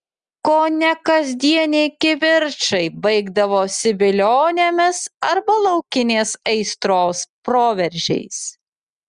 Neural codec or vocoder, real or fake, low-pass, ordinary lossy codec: none; real; 9.9 kHz; Opus, 64 kbps